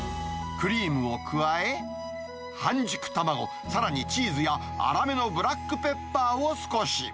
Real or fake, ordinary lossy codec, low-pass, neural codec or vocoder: real; none; none; none